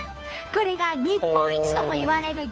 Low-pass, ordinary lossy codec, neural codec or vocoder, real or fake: none; none; codec, 16 kHz, 2 kbps, FunCodec, trained on Chinese and English, 25 frames a second; fake